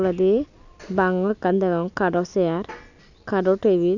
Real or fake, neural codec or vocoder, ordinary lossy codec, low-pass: real; none; none; 7.2 kHz